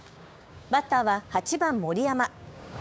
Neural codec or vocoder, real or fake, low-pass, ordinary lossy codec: codec, 16 kHz, 6 kbps, DAC; fake; none; none